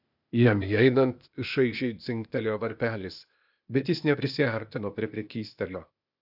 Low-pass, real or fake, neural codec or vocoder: 5.4 kHz; fake; codec, 16 kHz, 0.8 kbps, ZipCodec